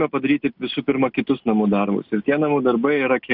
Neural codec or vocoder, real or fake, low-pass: none; real; 5.4 kHz